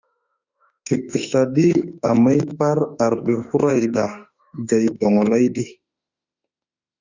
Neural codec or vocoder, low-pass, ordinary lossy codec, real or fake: autoencoder, 48 kHz, 32 numbers a frame, DAC-VAE, trained on Japanese speech; 7.2 kHz; Opus, 64 kbps; fake